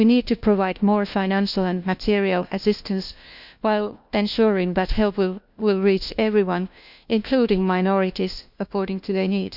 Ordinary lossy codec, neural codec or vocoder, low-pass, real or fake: none; codec, 16 kHz, 1 kbps, FunCodec, trained on LibriTTS, 50 frames a second; 5.4 kHz; fake